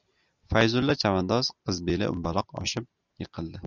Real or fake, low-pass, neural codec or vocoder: real; 7.2 kHz; none